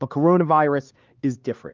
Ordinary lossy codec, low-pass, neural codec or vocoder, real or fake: Opus, 32 kbps; 7.2 kHz; codec, 16 kHz, 2 kbps, X-Codec, HuBERT features, trained on LibriSpeech; fake